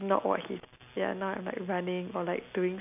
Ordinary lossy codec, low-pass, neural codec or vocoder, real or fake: none; 3.6 kHz; none; real